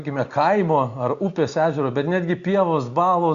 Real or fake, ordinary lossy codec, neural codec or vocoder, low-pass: real; Opus, 64 kbps; none; 7.2 kHz